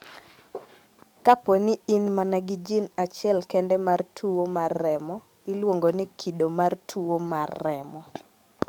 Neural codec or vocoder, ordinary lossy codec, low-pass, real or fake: codec, 44.1 kHz, 7.8 kbps, DAC; MP3, 96 kbps; 19.8 kHz; fake